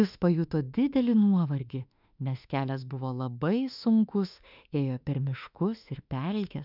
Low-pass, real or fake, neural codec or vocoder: 5.4 kHz; fake; autoencoder, 48 kHz, 32 numbers a frame, DAC-VAE, trained on Japanese speech